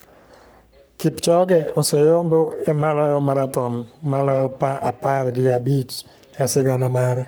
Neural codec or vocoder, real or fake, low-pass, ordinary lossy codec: codec, 44.1 kHz, 3.4 kbps, Pupu-Codec; fake; none; none